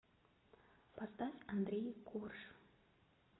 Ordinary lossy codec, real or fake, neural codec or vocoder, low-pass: AAC, 16 kbps; real; none; 7.2 kHz